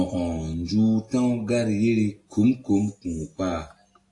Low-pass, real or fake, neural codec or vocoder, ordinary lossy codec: 10.8 kHz; real; none; AAC, 48 kbps